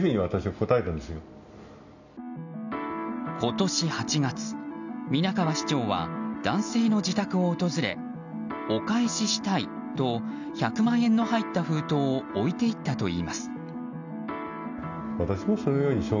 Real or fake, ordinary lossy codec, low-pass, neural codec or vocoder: real; none; 7.2 kHz; none